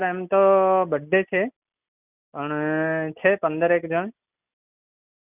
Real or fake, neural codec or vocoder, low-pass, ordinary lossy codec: real; none; 3.6 kHz; none